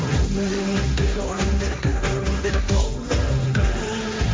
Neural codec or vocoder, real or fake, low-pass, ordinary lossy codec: codec, 16 kHz, 1.1 kbps, Voila-Tokenizer; fake; none; none